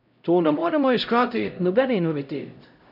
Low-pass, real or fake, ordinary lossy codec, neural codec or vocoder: 5.4 kHz; fake; none; codec, 16 kHz, 0.5 kbps, X-Codec, HuBERT features, trained on LibriSpeech